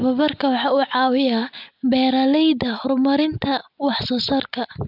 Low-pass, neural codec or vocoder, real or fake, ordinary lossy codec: 5.4 kHz; none; real; none